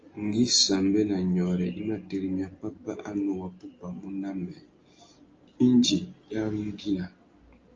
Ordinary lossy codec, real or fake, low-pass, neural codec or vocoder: Opus, 24 kbps; real; 7.2 kHz; none